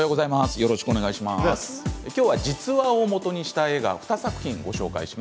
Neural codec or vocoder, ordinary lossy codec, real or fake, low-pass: none; none; real; none